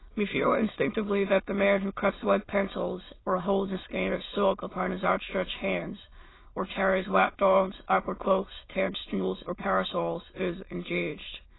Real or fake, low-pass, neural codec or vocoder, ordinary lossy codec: fake; 7.2 kHz; autoencoder, 22.05 kHz, a latent of 192 numbers a frame, VITS, trained on many speakers; AAC, 16 kbps